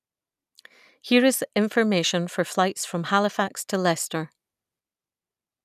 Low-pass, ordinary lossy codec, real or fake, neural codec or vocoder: 14.4 kHz; none; real; none